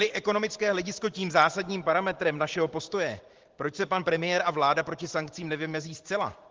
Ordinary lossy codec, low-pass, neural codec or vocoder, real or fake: Opus, 16 kbps; 7.2 kHz; vocoder, 44.1 kHz, 128 mel bands every 512 samples, BigVGAN v2; fake